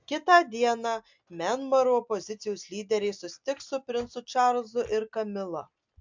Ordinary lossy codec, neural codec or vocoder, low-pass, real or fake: MP3, 64 kbps; none; 7.2 kHz; real